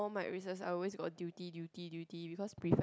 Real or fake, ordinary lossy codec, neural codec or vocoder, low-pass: real; none; none; none